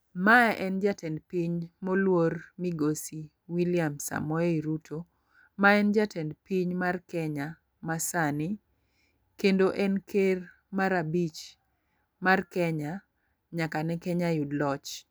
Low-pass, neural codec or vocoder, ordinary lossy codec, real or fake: none; none; none; real